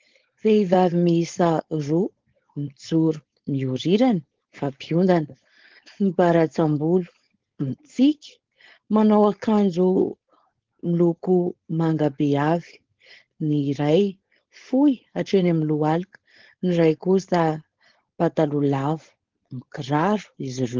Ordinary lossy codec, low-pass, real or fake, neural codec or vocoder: Opus, 16 kbps; 7.2 kHz; fake; codec, 16 kHz, 4.8 kbps, FACodec